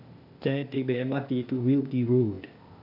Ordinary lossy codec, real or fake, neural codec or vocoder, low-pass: none; fake; codec, 16 kHz, 0.8 kbps, ZipCodec; 5.4 kHz